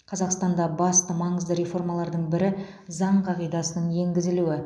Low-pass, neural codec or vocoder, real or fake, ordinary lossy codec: none; none; real; none